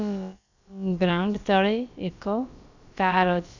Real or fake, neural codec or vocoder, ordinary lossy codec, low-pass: fake; codec, 16 kHz, about 1 kbps, DyCAST, with the encoder's durations; none; 7.2 kHz